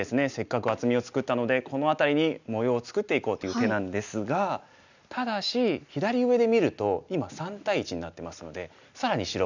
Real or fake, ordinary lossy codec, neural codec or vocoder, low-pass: real; none; none; 7.2 kHz